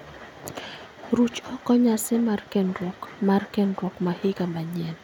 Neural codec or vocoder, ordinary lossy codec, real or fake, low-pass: none; none; real; 19.8 kHz